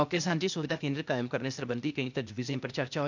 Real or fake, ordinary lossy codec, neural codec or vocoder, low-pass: fake; none; codec, 16 kHz, 0.8 kbps, ZipCodec; 7.2 kHz